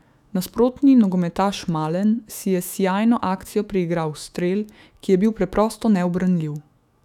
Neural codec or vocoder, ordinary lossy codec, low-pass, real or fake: autoencoder, 48 kHz, 128 numbers a frame, DAC-VAE, trained on Japanese speech; none; 19.8 kHz; fake